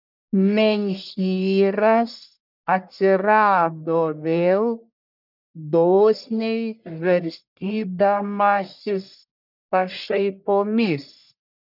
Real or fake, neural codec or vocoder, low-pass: fake; codec, 44.1 kHz, 1.7 kbps, Pupu-Codec; 5.4 kHz